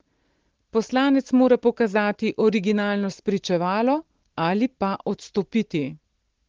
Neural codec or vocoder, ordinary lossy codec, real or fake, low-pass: none; Opus, 16 kbps; real; 7.2 kHz